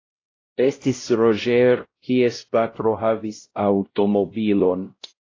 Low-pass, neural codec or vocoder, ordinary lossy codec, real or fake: 7.2 kHz; codec, 16 kHz, 0.5 kbps, X-Codec, WavLM features, trained on Multilingual LibriSpeech; AAC, 32 kbps; fake